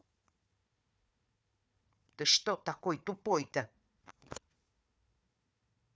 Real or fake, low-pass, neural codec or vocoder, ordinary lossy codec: fake; none; codec, 16 kHz, 4 kbps, FunCodec, trained on Chinese and English, 50 frames a second; none